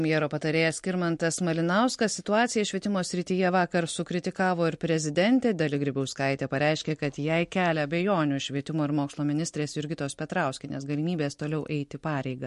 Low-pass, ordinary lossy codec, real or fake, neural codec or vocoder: 14.4 kHz; MP3, 48 kbps; real; none